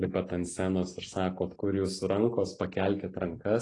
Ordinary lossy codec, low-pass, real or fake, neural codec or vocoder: AAC, 32 kbps; 10.8 kHz; real; none